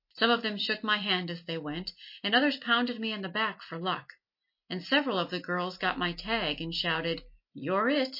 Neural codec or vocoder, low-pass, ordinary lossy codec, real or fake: none; 5.4 kHz; MP3, 32 kbps; real